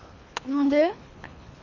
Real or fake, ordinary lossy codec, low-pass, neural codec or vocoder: fake; none; 7.2 kHz; codec, 24 kHz, 3 kbps, HILCodec